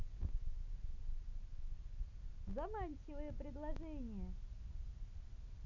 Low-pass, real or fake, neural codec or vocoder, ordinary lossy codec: 7.2 kHz; real; none; none